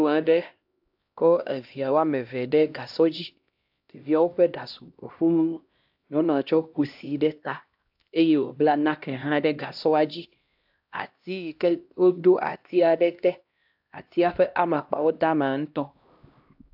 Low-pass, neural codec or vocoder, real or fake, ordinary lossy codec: 5.4 kHz; codec, 16 kHz, 1 kbps, X-Codec, HuBERT features, trained on LibriSpeech; fake; AAC, 48 kbps